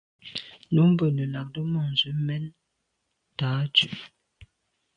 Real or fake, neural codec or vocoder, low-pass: real; none; 9.9 kHz